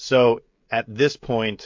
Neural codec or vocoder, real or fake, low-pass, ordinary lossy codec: codec, 16 kHz, 16 kbps, FreqCodec, smaller model; fake; 7.2 kHz; MP3, 48 kbps